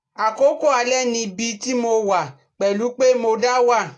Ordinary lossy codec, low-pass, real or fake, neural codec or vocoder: AAC, 48 kbps; 10.8 kHz; real; none